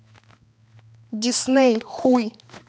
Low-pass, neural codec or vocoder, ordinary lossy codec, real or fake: none; codec, 16 kHz, 2 kbps, X-Codec, HuBERT features, trained on balanced general audio; none; fake